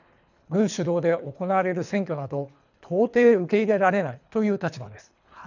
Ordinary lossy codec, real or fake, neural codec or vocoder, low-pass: none; fake; codec, 24 kHz, 3 kbps, HILCodec; 7.2 kHz